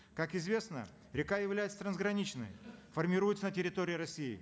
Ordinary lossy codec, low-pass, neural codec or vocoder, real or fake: none; none; none; real